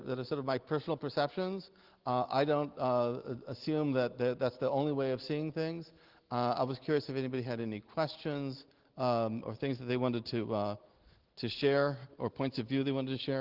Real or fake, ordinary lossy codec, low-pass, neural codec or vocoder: real; Opus, 16 kbps; 5.4 kHz; none